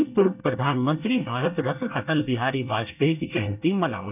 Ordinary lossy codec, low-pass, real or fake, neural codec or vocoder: none; 3.6 kHz; fake; codec, 24 kHz, 1 kbps, SNAC